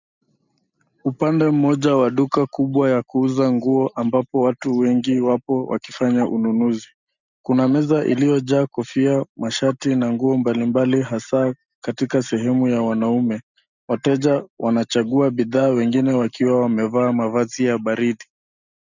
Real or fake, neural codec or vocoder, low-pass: real; none; 7.2 kHz